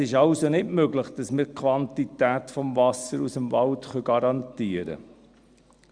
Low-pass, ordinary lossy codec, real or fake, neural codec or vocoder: 9.9 kHz; Opus, 64 kbps; real; none